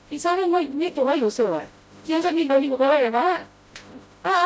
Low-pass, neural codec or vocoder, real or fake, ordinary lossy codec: none; codec, 16 kHz, 0.5 kbps, FreqCodec, smaller model; fake; none